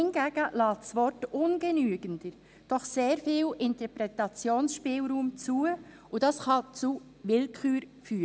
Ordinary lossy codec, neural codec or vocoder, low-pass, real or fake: none; none; none; real